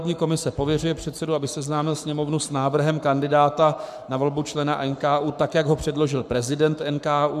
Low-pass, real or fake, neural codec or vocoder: 14.4 kHz; fake; codec, 44.1 kHz, 7.8 kbps, Pupu-Codec